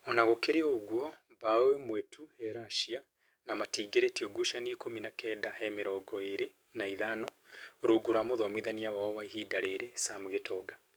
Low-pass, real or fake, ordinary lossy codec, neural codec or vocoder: none; fake; none; codec, 44.1 kHz, 7.8 kbps, DAC